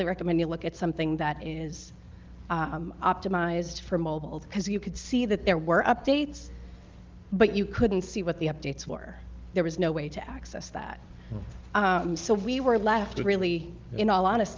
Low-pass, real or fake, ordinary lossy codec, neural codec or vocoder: 7.2 kHz; real; Opus, 32 kbps; none